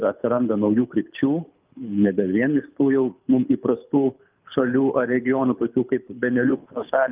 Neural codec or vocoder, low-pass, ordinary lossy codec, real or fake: codec, 24 kHz, 6 kbps, HILCodec; 3.6 kHz; Opus, 32 kbps; fake